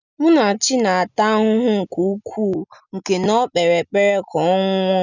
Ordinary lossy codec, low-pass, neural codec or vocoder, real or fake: none; 7.2 kHz; none; real